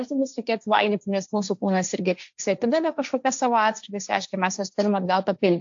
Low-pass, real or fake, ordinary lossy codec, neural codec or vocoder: 7.2 kHz; fake; AAC, 64 kbps; codec, 16 kHz, 1.1 kbps, Voila-Tokenizer